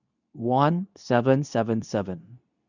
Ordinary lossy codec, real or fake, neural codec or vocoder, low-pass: none; fake; codec, 24 kHz, 0.9 kbps, WavTokenizer, medium speech release version 2; 7.2 kHz